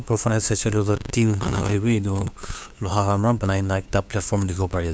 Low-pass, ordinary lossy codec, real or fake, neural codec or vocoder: none; none; fake; codec, 16 kHz, 2 kbps, FunCodec, trained on LibriTTS, 25 frames a second